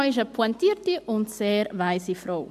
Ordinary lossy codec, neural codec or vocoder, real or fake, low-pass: MP3, 64 kbps; none; real; 14.4 kHz